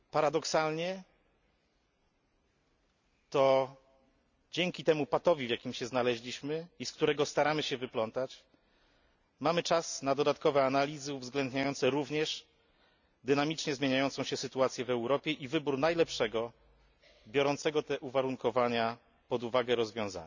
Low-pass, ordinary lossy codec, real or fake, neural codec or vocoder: 7.2 kHz; none; real; none